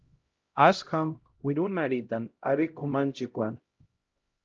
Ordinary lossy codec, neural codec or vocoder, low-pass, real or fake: Opus, 24 kbps; codec, 16 kHz, 0.5 kbps, X-Codec, HuBERT features, trained on LibriSpeech; 7.2 kHz; fake